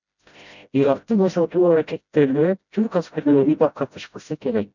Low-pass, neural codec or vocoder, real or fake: 7.2 kHz; codec, 16 kHz, 0.5 kbps, FreqCodec, smaller model; fake